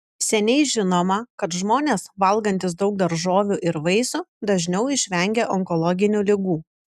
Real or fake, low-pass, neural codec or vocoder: real; 14.4 kHz; none